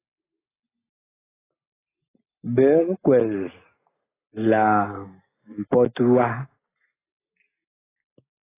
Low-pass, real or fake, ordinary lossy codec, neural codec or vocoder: 3.6 kHz; real; AAC, 16 kbps; none